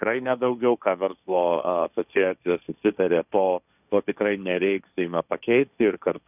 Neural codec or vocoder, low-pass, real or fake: codec, 16 kHz, 1.1 kbps, Voila-Tokenizer; 3.6 kHz; fake